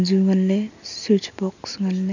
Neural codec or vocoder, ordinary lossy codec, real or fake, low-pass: none; none; real; 7.2 kHz